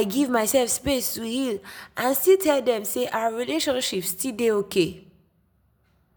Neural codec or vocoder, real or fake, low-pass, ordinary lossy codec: none; real; none; none